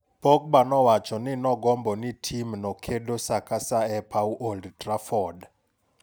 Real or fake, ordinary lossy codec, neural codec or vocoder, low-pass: real; none; none; none